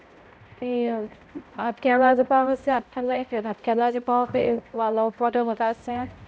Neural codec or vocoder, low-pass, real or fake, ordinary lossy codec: codec, 16 kHz, 0.5 kbps, X-Codec, HuBERT features, trained on balanced general audio; none; fake; none